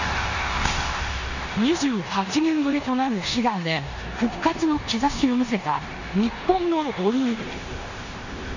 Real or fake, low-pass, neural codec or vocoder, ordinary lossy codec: fake; 7.2 kHz; codec, 16 kHz in and 24 kHz out, 0.9 kbps, LongCat-Audio-Codec, four codebook decoder; none